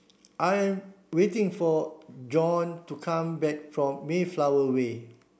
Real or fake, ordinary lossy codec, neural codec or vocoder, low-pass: real; none; none; none